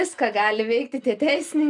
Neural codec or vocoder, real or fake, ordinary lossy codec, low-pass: none; real; MP3, 96 kbps; 10.8 kHz